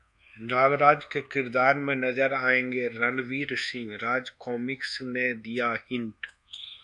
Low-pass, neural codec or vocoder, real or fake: 10.8 kHz; codec, 24 kHz, 1.2 kbps, DualCodec; fake